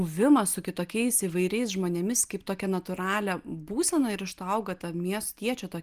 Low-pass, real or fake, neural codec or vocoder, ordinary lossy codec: 14.4 kHz; real; none; Opus, 32 kbps